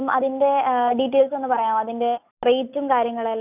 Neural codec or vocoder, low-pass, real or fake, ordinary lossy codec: none; 3.6 kHz; real; none